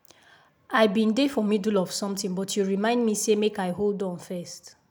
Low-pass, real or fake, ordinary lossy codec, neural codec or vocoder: none; real; none; none